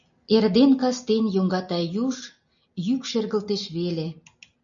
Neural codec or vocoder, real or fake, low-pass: none; real; 7.2 kHz